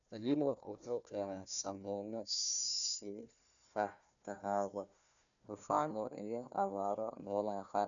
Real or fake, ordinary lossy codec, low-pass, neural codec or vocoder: fake; none; 7.2 kHz; codec, 16 kHz, 1 kbps, FunCodec, trained on Chinese and English, 50 frames a second